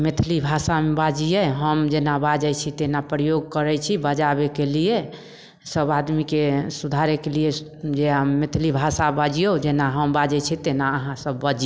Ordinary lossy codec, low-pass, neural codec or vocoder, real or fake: none; none; none; real